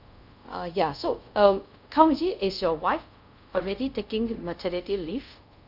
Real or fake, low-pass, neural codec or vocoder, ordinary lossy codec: fake; 5.4 kHz; codec, 24 kHz, 0.5 kbps, DualCodec; none